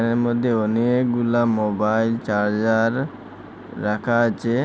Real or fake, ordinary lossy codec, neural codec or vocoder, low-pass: real; none; none; none